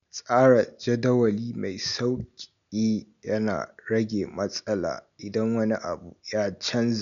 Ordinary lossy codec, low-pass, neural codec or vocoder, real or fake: none; 7.2 kHz; none; real